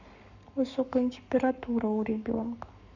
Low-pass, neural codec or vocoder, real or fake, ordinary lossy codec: 7.2 kHz; codec, 44.1 kHz, 7.8 kbps, Pupu-Codec; fake; none